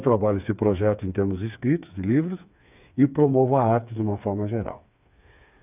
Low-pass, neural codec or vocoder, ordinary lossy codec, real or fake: 3.6 kHz; codec, 16 kHz, 4 kbps, FreqCodec, smaller model; none; fake